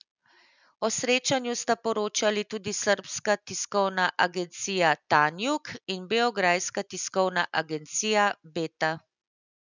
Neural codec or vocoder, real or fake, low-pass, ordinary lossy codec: none; real; 7.2 kHz; none